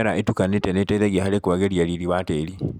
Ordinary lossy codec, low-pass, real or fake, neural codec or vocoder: none; 19.8 kHz; real; none